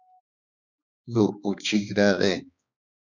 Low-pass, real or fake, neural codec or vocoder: 7.2 kHz; fake; codec, 16 kHz, 2 kbps, X-Codec, HuBERT features, trained on balanced general audio